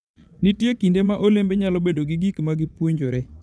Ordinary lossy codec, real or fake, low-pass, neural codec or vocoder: none; fake; none; vocoder, 22.05 kHz, 80 mel bands, Vocos